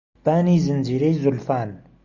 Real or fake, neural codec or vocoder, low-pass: real; none; 7.2 kHz